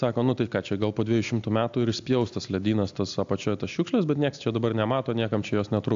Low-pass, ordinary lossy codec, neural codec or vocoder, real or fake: 7.2 kHz; AAC, 64 kbps; none; real